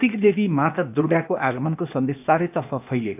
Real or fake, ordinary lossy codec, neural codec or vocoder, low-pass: fake; none; codec, 16 kHz, 0.8 kbps, ZipCodec; 3.6 kHz